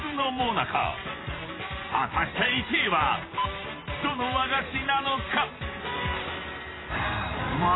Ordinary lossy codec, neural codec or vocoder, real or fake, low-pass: AAC, 16 kbps; vocoder, 44.1 kHz, 128 mel bands every 512 samples, BigVGAN v2; fake; 7.2 kHz